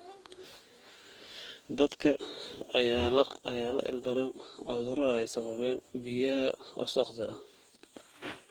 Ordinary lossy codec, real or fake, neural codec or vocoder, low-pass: Opus, 24 kbps; fake; codec, 44.1 kHz, 2.6 kbps, DAC; 19.8 kHz